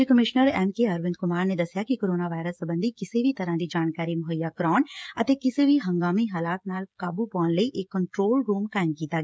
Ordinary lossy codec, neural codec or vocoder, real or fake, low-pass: none; codec, 16 kHz, 16 kbps, FreqCodec, smaller model; fake; none